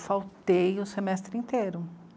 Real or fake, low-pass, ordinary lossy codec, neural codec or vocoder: real; none; none; none